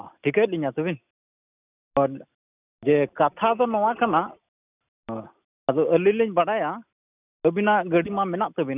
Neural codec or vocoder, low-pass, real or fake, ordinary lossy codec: none; 3.6 kHz; real; none